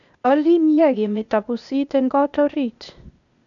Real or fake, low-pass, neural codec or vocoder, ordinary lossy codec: fake; 7.2 kHz; codec, 16 kHz, 0.8 kbps, ZipCodec; none